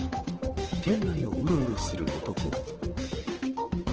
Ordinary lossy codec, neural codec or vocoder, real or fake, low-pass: Opus, 16 kbps; codec, 16 kHz, 8 kbps, FunCodec, trained on Chinese and English, 25 frames a second; fake; 7.2 kHz